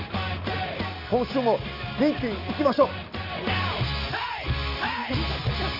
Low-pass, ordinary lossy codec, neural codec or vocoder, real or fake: 5.4 kHz; none; none; real